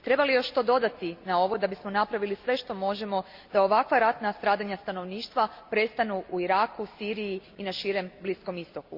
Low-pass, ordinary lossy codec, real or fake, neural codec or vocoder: 5.4 kHz; Opus, 64 kbps; real; none